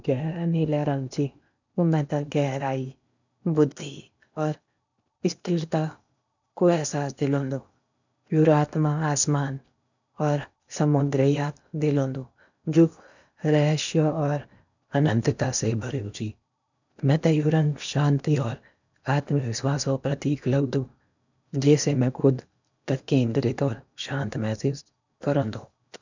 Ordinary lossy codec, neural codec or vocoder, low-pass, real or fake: none; codec, 16 kHz in and 24 kHz out, 0.8 kbps, FocalCodec, streaming, 65536 codes; 7.2 kHz; fake